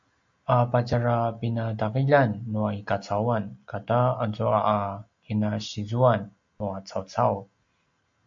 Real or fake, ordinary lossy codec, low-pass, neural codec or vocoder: real; MP3, 48 kbps; 7.2 kHz; none